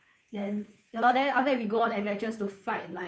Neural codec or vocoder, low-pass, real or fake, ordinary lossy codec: codec, 16 kHz, 2 kbps, FunCodec, trained on Chinese and English, 25 frames a second; none; fake; none